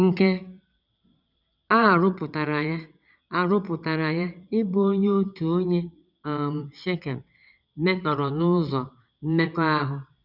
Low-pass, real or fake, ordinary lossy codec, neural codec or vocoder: 5.4 kHz; fake; none; vocoder, 22.05 kHz, 80 mel bands, WaveNeXt